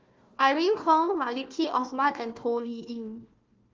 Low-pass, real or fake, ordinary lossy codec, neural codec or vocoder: 7.2 kHz; fake; Opus, 32 kbps; codec, 16 kHz, 1 kbps, FunCodec, trained on Chinese and English, 50 frames a second